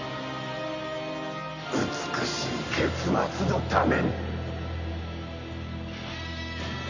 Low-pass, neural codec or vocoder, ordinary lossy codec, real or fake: 7.2 kHz; none; none; real